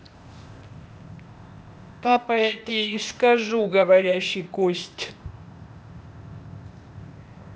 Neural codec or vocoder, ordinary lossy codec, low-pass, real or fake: codec, 16 kHz, 0.8 kbps, ZipCodec; none; none; fake